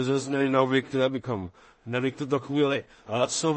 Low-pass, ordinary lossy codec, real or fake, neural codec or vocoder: 10.8 kHz; MP3, 32 kbps; fake; codec, 16 kHz in and 24 kHz out, 0.4 kbps, LongCat-Audio-Codec, two codebook decoder